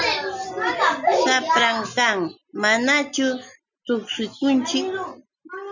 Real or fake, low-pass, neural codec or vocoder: real; 7.2 kHz; none